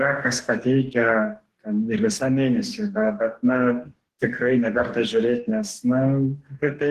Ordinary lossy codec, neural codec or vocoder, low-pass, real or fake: Opus, 16 kbps; codec, 44.1 kHz, 2.6 kbps, DAC; 14.4 kHz; fake